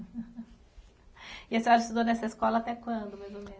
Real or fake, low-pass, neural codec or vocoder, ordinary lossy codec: real; none; none; none